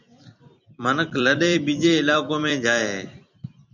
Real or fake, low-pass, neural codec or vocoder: fake; 7.2 kHz; vocoder, 44.1 kHz, 128 mel bands every 256 samples, BigVGAN v2